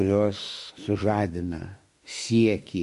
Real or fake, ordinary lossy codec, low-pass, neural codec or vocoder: fake; MP3, 48 kbps; 14.4 kHz; autoencoder, 48 kHz, 32 numbers a frame, DAC-VAE, trained on Japanese speech